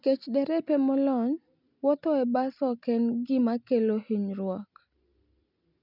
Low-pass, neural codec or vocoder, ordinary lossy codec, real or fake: 5.4 kHz; none; none; real